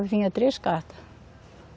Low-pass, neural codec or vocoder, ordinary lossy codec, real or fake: none; none; none; real